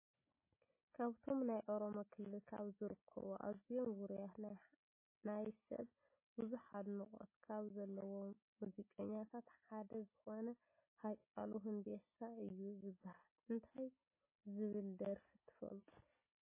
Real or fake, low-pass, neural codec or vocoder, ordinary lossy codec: real; 3.6 kHz; none; MP3, 16 kbps